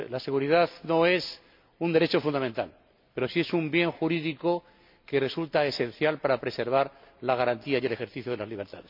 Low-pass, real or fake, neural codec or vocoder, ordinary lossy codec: 5.4 kHz; real; none; MP3, 48 kbps